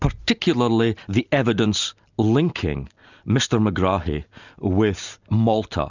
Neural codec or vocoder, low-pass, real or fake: none; 7.2 kHz; real